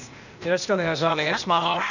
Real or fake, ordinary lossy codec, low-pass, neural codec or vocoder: fake; none; 7.2 kHz; codec, 16 kHz, 0.8 kbps, ZipCodec